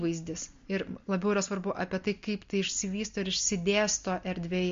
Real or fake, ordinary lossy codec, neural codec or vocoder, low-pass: real; MP3, 48 kbps; none; 7.2 kHz